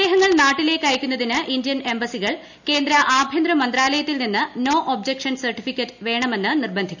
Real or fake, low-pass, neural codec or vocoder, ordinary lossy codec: real; 7.2 kHz; none; none